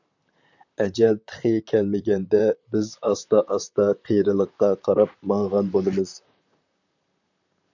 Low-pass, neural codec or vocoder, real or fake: 7.2 kHz; vocoder, 44.1 kHz, 128 mel bands, Pupu-Vocoder; fake